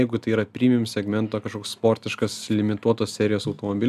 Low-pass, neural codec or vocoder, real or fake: 14.4 kHz; none; real